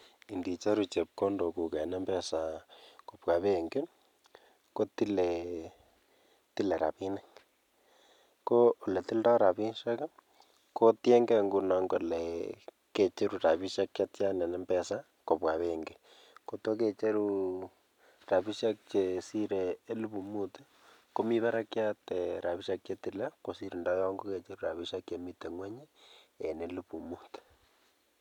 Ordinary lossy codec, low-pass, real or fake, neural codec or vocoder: none; 19.8 kHz; real; none